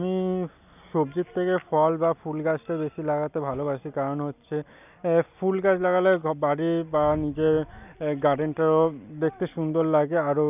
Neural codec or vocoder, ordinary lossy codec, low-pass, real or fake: none; none; 3.6 kHz; real